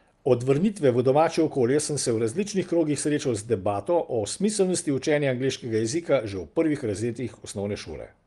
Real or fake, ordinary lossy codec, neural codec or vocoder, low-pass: real; Opus, 32 kbps; none; 10.8 kHz